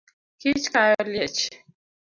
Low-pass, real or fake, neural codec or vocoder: 7.2 kHz; real; none